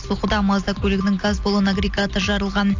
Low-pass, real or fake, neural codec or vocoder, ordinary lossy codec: 7.2 kHz; real; none; AAC, 48 kbps